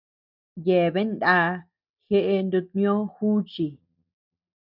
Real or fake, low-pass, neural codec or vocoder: real; 5.4 kHz; none